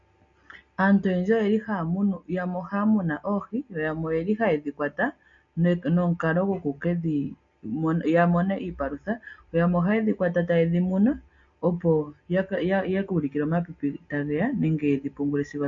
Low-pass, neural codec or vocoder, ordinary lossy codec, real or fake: 7.2 kHz; none; MP3, 48 kbps; real